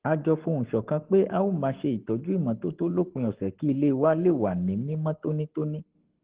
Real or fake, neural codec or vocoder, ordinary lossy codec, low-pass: fake; codec, 44.1 kHz, 7.8 kbps, Pupu-Codec; Opus, 16 kbps; 3.6 kHz